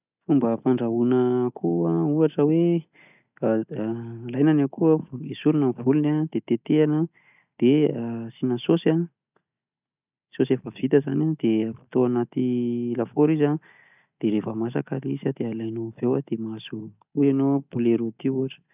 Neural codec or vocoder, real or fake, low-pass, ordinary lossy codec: none; real; 3.6 kHz; none